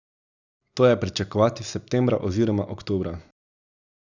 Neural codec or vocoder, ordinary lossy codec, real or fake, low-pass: none; none; real; 7.2 kHz